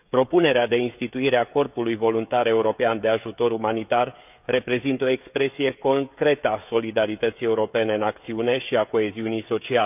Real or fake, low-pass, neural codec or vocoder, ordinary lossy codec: fake; 3.6 kHz; codec, 16 kHz, 16 kbps, FreqCodec, smaller model; none